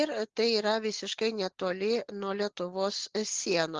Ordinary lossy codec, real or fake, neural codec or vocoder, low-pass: Opus, 16 kbps; real; none; 7.2 kHz